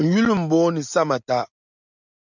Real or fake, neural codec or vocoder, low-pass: real; none; 7.2 kHz